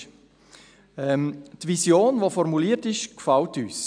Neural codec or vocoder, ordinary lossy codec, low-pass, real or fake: none; none; 9.9 kHz; real